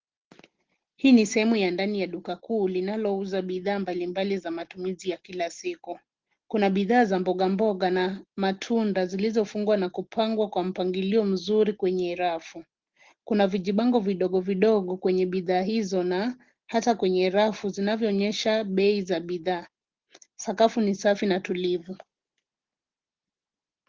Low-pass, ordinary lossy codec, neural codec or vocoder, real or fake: 7.2 kHz; Opus, 16 kbps; none; real